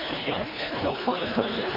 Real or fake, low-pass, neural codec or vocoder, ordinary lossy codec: fake; 5.4 kHz; codec, 24 kHz, 1.5 kbps, HILCodec; MP3, 24 kbps